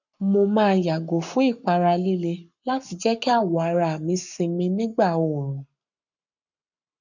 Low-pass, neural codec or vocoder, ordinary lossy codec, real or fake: 7.2 kHz; codec, 44.1 kHz, 7.8 kbps, Pupu-Codec; none; fake